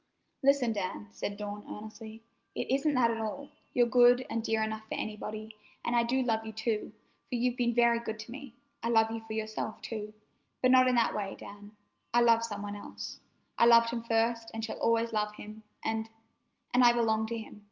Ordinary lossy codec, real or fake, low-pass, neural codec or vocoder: Opus, 24 kbps; real; 7.2 kHz; none